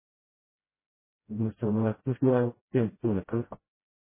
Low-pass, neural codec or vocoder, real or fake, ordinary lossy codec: 3.6 kHz; codec, 16 kHz, 0.5 kbps, FreqCodec, smaller model; fake; MP3, 16 kbps